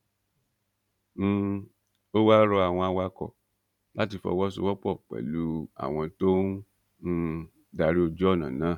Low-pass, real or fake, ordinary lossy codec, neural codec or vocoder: 19.8 kHz; fake; none; vocoder, 44.1 kHz, 128 mel bands every 512 samples, BigVGAN v2